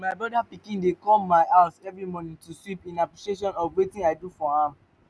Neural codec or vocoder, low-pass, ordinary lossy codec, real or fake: none; none; none; real